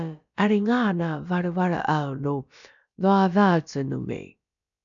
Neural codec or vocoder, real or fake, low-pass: codec, 16 kHz, about 1 kbps, DyCAST, with the encoder's durations; fake; 7.2 kHz